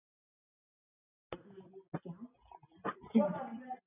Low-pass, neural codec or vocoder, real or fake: 3.6 kHz; none; real